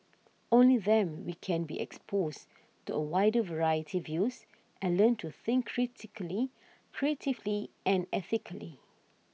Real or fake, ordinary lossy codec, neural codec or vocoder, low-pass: real; none; none; none